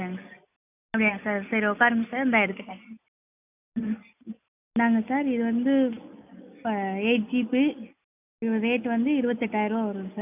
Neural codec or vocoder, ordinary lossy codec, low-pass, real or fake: none; none; 3.6 kHz; real